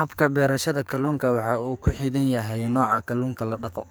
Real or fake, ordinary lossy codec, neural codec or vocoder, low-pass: fake; none; codec, 44.1 kHz, 2.6 kbps, SNAC; none